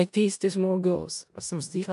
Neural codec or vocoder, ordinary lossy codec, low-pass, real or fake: codec, 16 kHz in and 24 kHz out, 0.4 kbps, LongCat-Audio-Codec, four codebook decoder; AAC, 96 kbps; 10.8 kHz; fake